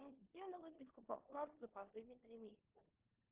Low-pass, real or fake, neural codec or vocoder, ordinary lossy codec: 3.6 kHz; fake; codec, 16 kHz in and 24 kHz out, 0.9 kbps, LongCat-Audio-Codec, four codebook decoder; Opus, 16 kbps